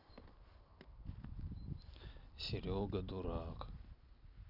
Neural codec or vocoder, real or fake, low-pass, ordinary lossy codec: none; real; 5.4 kHz; none